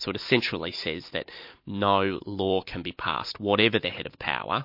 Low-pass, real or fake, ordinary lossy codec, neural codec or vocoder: 5.4 kHz; real; MP3, 32 kbps; none